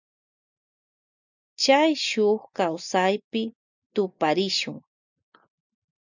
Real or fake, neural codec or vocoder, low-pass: real; none; 7.2 kHz